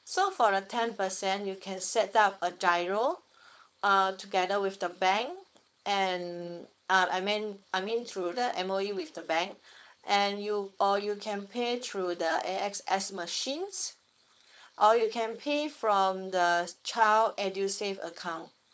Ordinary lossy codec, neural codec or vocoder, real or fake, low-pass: none; codec, 16 kHz, 4.8 kbps, FACodec; fake; none